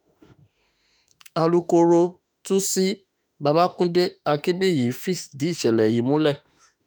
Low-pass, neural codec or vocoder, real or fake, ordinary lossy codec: none; autoencoder, 48 kHz, 32 numbers a frame, DAC-VAE, trained on Japanese speech; fake; none